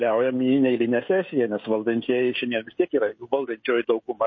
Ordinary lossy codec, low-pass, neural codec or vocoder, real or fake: MP3, 32 kbps; 7.2 kHz; codec, 16 kHz, 16 kbps, FreqCodec, smaller model; fake